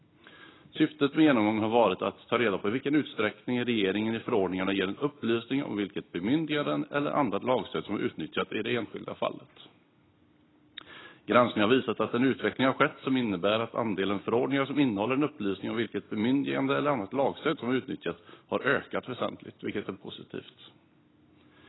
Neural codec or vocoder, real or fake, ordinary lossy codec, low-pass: none; real; AAC, 16 kbps; 7.2 kHz